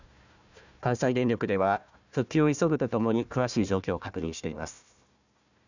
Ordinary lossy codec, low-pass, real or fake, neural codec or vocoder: none; 7.2 kHz; fake; codec, 16 kHz, 1 kbps, FunCodec, trained on Chinese and English, 50 frames a second